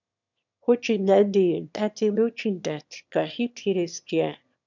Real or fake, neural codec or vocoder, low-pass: fake; autoencoder, 22.05 kHz, a latent of 192 numbers a frame, VITS, trained on one speaker; 7.2 kHz